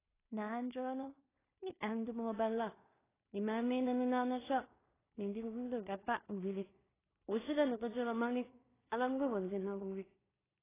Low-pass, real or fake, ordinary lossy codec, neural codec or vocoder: 3.6 kHz; fake; AAC, 16 kbps; codec, 16 kHz in and 24 kHz out, 0.4 kbps, LongCat-Audio-Codec, two codebook decoder